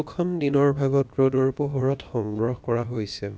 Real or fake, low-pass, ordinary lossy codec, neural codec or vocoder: fake; none; none; codec, 16 kHz, about 1 kbps, DyCAST, with the encoder's durations